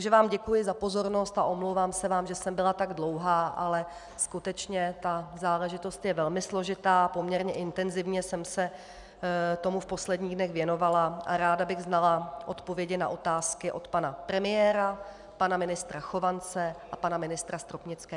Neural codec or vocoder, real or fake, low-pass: none; real; 10.8 kHz